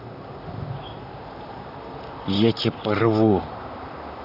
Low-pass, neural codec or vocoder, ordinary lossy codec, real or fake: 5.4 kHz; vocoder, 44.1 kHz, 128 mel bands, Pupu-Vocoder; AAC, 48 kbps; fake